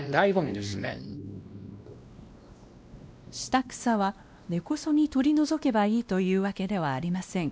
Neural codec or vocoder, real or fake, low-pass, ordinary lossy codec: codec, 16 kHz, 1 kbps, X-Codec, WavLM features, trained on Multilingual LibriSpeech; fake; none; none